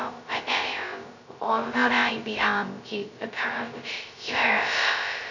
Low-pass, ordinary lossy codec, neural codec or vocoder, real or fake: 7.2 kHz; none; codec, 16 kHz, 0.2 kbps, FocalCodec; fake